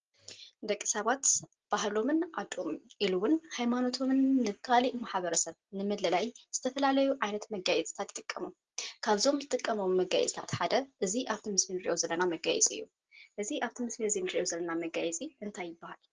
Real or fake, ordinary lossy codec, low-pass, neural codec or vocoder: real; Opus, 16 kbps; 7.2 kHz; none